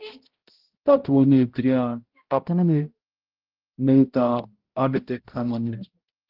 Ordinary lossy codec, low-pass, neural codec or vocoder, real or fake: Opus, 16 kbps; 5.4 kHz; codec, 16 kHz, 0.5 kbps, X-Codec, HuBERT features, trained on balanced general audio; fake